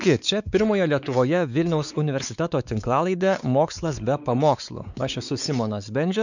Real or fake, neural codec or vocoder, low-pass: fake; codec, 16 kHz, 4 kbps, X-Codec, WavLM features, trained on Multilingual LibriSpeech; 7.2 kHz